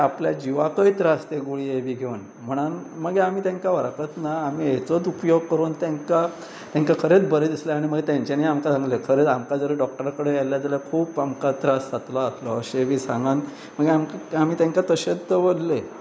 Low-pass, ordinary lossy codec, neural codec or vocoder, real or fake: none; none; none; real